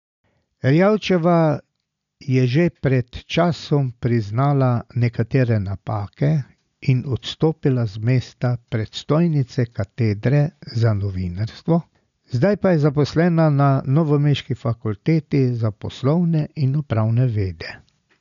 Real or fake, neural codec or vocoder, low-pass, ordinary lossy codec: real; none; 7.2 kHz; none